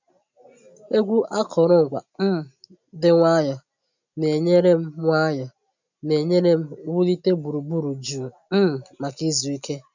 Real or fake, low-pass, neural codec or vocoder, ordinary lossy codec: real; 7.2 kHz; none; none